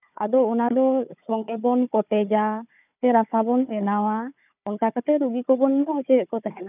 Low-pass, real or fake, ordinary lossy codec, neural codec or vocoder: 3.6 kHz; fake; none; codec, 16 kHz, 4 kbps, FunCodec, trained on Chinese and English, 50 frames a second